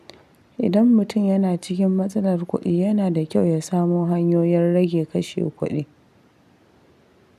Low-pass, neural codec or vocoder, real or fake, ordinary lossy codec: 14.4 kHz; none; real; none